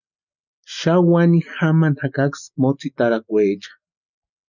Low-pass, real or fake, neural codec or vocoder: 7.2 kHz; real; none